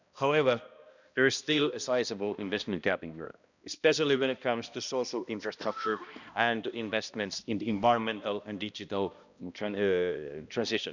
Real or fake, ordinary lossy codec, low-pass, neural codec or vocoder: fake; none; 7.2 kHz; codec, 16 kHz, 1 kbps, X-Codec, HuBERT features, trained on balanced general audio